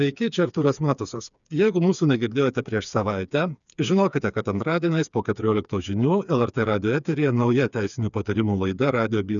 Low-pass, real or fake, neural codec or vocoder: 7.2 kHz; fake; codec, 16 kHz, 4 kbps, FreqCodec, smaller model